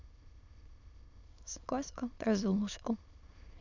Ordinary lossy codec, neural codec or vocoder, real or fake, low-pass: none; autoencoder, 22.05 kHz, a latent of 192 numbers a frame, VITS, trained on many speakers; fake; 7.2 kHz